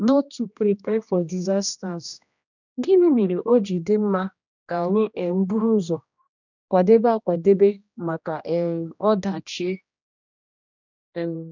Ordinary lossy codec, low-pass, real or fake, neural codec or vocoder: none; 7.2 kHz; fake; codec, 16 kHz, 1 kbps, X-Codec, HuBERT features, trained on general audio